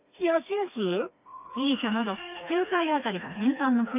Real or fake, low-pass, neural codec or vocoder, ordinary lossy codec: fake; 3.6 kHz; codec, 16 kHz, 2 kbps, FreqCodec, smaller model; none